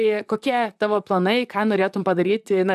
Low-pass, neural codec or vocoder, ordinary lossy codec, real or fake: 14.4 kHz; vocoder, 44.1 kHz, 128 mel bands, Pupu-Vocoder; AAC, 96 kbps; fake